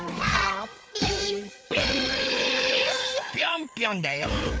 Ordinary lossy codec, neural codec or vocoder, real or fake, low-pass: none; codec, 16 kHz, 4 kbps, FreqCodec, larger model; fake; none